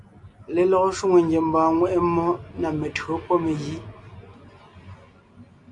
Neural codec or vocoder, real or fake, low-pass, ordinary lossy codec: none; real; 10.8 kHz; AAC, 64 kbps